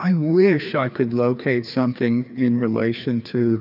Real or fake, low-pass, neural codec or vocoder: fake; 5.4 kHz; codec, 16 kHz, 2 kbps, FreqCodec, larger model